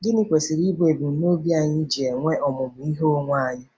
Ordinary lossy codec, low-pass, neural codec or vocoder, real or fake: none; none; none; real